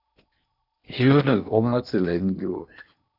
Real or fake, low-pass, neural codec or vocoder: fake; 5.4 kHz; codec, 16 kHz in and 24 kHz out, 0.8 kbps, FocalCodec, streaming, 65536 codes